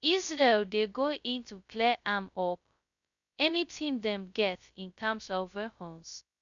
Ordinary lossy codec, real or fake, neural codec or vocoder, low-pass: none; fake; codec, 16 kHz, 0.2 kbps, FocalCodec; 7.2 kHz